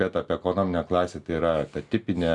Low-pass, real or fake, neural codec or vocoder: 10.8 kHz; real; none